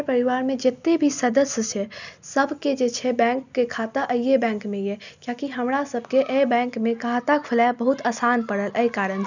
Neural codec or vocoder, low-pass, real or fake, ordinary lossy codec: none; 7.2 kHz; real; none